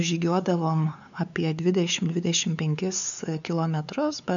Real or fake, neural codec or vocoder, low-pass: fake; codec, 16 kHz, 4 kbps, X-Codec, WavLM features, trained on Multilingual LibriSpeech; 7.2 kHz